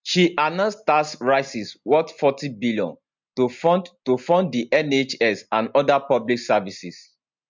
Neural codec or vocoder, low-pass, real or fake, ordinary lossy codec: none; 7.2 kHz; real; MP3, 64 kbps